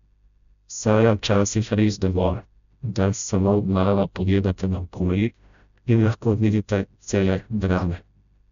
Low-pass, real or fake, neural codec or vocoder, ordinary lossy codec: 7.2 kHz; fake; codec, 16 kHz, 0.5 kbps, FreqCodec, smaller model; none